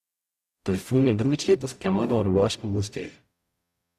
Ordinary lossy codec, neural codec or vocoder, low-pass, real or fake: Opus, 64 kbps; codec, 44.1 kHz, 0.9 kbps, DAC; 14.4 kHz; fake